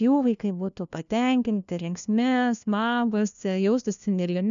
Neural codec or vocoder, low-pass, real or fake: codec, 16 kHz, 1 kbps, FunCodec, trained on LibriTTS, 50 frames a second; 7.2 kHz; fake